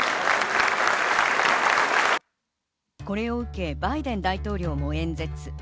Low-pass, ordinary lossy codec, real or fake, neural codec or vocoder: none; none; real; none